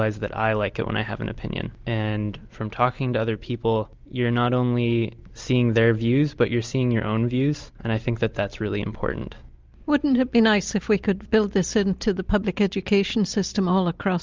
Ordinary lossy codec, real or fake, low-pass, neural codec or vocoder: Opus, 24 kbps; real; 7.2 kHz; none